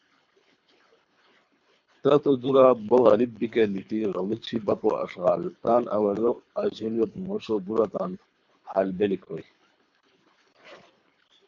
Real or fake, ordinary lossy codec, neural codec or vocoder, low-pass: fake; MP3, 64 kbps; codec, 24 kHz, 3 kbps, HILCodec; 7.2 kHz